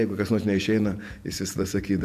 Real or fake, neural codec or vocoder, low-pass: fake; vocoder, 48 kHz, 128 mel bands, Vocos; 14.4 kHz